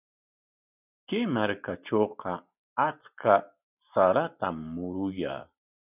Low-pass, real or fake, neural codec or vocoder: 3.6 kHz; real; none